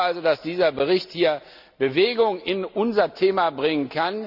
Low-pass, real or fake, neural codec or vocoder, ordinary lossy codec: 5.4 kHz; real; none; none